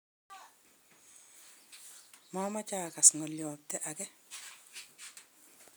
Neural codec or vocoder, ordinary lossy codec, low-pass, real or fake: none; none; none; real